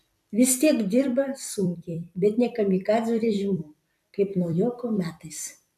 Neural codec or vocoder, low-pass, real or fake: vocoder, 44.1 kHz, 128 mel bands every 256 samples, BigVGAN v2; 14.4 kHz; fake